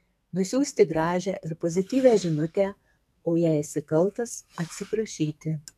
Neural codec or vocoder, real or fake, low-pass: codec, 44.1 kHz, 2.6 kbps, SNAC; fake; 14.4 kHz